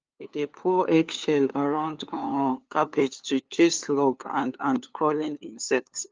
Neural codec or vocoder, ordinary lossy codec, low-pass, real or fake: codec, 16 kHz, 2 kbps, FunCodec, trained on LibriTTS, 25 frames a second; Opus, 24 kbps; 7.2 kHz; fake